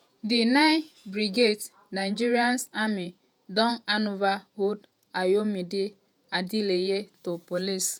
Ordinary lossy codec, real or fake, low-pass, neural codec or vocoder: none; fake; none; vocoder, 48 kHz, 128 mel bands, Vocos